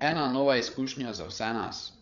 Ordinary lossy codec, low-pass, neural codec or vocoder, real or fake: none; 7.2 kHz; codec, 16 kHz, 8 kbps, FreqCodec, larger model; fake